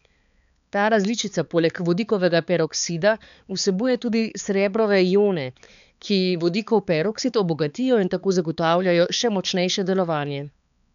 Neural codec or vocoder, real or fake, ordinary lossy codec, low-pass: codec, 16 kHz, 4 kbps, X-Codec, HuBERT features, trained on balanced general audio; fake; none; 7.2 kHz